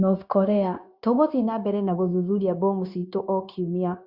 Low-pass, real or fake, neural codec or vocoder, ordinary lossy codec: 5.4 kHz; fake; codec, 16 kHz, 0.9 kbps, LongCat-Audio-Codec; Opus, 64 kbps